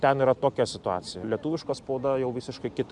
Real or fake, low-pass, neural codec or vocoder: real; 10.8 kHz; none